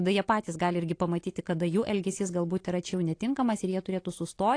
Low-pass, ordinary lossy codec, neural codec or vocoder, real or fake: 9.9 kHz; AAC, 48 kbps; none; real